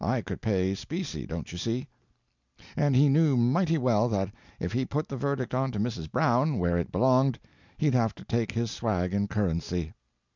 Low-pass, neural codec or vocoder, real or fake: 7.2 kHz; none; real